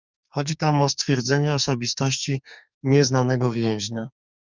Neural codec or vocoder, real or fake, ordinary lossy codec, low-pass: codec, 44.1 kHz, 2.6 kbps, SNAC; fake; Opus, 64 kbps; 7.2 kHz